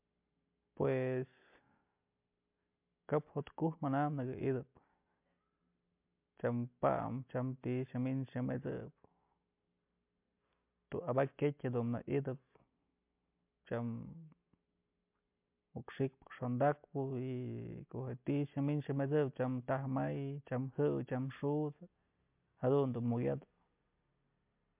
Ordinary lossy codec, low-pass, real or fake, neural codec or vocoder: MP3, 32 kbps; 3.6 kHz; real; none